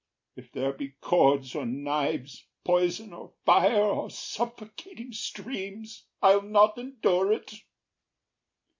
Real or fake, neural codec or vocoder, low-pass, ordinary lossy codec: real; none; 7.2 kHz; MP3, 48 kbps